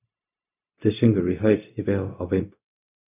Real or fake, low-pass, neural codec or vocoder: fake; 3.6 kHz; codec, 16 kHz, 0.4 kbps, LongCat-Audio-Codec